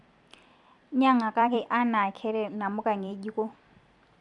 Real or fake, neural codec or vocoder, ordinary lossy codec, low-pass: fake; vocoder, 44.1 kHz, 128 mel bands every 256 samples, BigVGAN v2; none; 10.8 kHz